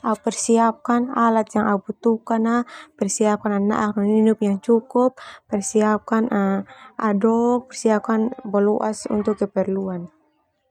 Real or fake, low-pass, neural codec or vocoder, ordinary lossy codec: real; 19.8 kHz; none; none